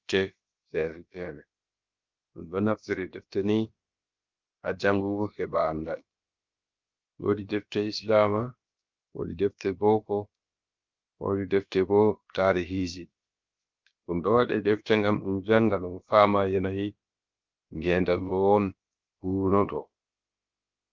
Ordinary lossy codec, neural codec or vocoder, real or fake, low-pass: Opus, 24 kbps; codec, 16 kHz, about 1 kbps, DyCAST, with the encoder's durations; fake; 7.2 kHz